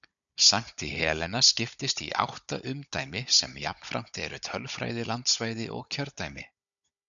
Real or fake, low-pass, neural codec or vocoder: fake; 7.2 kHz; codec, 16 kHz, 16 kbps, FunCodec, trained on Chinese and English, 50 frames a second